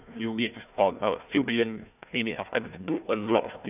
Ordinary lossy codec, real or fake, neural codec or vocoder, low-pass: none; fake; codec, 16 kHz, 1 kbps, FunCodec, trained on Chinese and English, 50 frames a second; 3.6 kHz